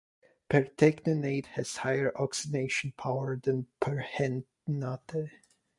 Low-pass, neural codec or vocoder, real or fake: 10.8 kHz; none; real